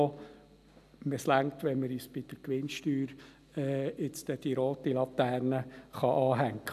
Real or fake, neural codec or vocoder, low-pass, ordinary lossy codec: real; none; 14.4 kHz; none